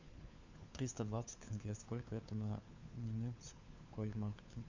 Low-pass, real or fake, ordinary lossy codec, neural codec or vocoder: 7.2 kHz; fake; Opus, 64 kbps; codec, 16 kHz, 4 kbps, FunCodec, trained on LibriTTS, 50 frames a second